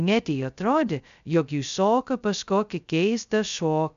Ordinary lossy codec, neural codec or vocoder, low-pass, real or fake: AAC, 96 kbps; codec, 16 kHz, 0.2 kbps, FocalCodec; 7.2 kHz; fake